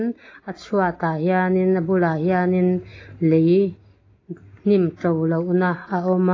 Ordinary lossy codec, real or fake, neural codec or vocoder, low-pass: AAC, 32 kbps; real; none; 7.2 kHz